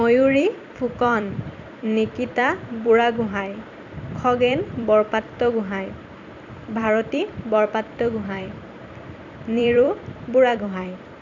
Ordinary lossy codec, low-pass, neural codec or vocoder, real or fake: none; 7.2 kHz; vocoder, 44.1 kHz, 128 mel bands every 256 samples, BigVGAN v2; fake